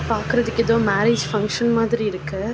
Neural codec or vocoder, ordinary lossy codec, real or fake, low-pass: none; none; real; none